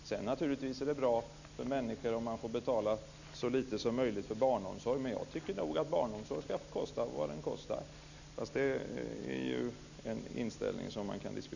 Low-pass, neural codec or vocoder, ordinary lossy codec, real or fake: 7.2 kHz; none; none; real